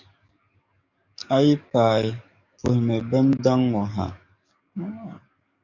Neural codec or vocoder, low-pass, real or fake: codec, 44.1 kHz, 7.8 kbps, DAC; 7.2 kHz; fake